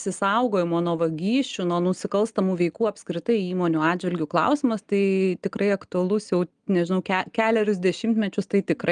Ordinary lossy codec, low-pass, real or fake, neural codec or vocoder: Opus, 32 kbps; 9.9 kHz; real; none